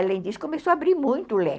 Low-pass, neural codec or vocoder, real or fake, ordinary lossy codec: none; none; real; none